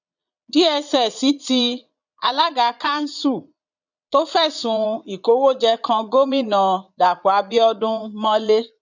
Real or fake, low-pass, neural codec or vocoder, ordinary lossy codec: fake; 7.2 kHz; vocoder, 22.05 kHz, 80 mel bands, Vocos; none